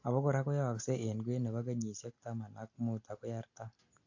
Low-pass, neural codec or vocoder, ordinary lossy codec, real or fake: 7.2 kHz; none; AAC, 48 kbps; real